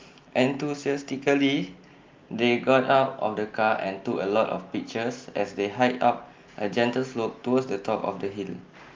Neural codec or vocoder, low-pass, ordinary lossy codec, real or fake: none; 7.2 kHz; Opus, 32 kbps; real